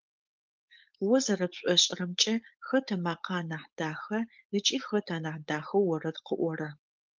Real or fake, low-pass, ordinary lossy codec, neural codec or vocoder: fake; 7.2 kHz; Opus, 24 kbps; codec, 16 kHz, 4.8 kbps, FACodec